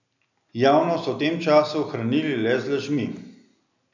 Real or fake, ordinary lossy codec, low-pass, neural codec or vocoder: real; none; 7.2 kHz; none